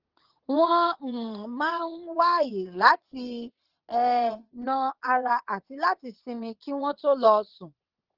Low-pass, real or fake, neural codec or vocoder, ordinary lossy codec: 5.4 kHz; fake; vocoder, 22.05 kHz, 80 mel bands, WaveNeXt; Opus, 16 kbps